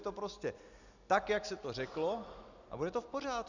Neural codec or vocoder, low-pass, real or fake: none; 7.2 kHz; real